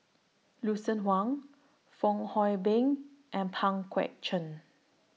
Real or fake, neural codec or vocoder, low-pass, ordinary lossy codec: real; none; none; none